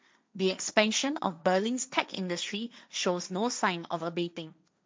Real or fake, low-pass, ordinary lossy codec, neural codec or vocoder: fake; none; none; codec, 16 kHz, 1.1 kbps, Voila-Tokenizer